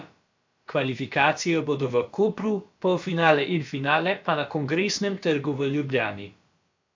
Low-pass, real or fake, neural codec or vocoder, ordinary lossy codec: 7.2 kHz; fake; codec, 16 kHz, about 1 kbps, DyCAST, with the encoder's durations; MP3, 64 kbps